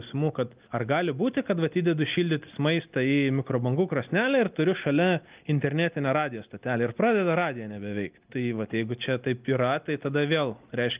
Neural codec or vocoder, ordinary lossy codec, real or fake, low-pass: none; Opus, 24 kbps; real; 3.6 kHz